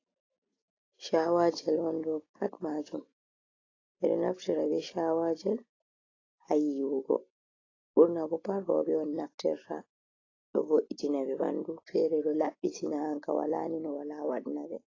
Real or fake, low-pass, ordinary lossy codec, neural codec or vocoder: real; 7.2 kHz; AAC, 32 kbps; none